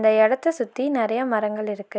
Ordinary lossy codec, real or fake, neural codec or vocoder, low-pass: none; real; none; none